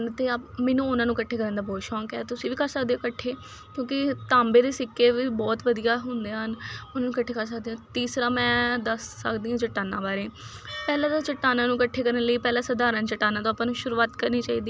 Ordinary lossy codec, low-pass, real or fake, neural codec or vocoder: none; none; real; none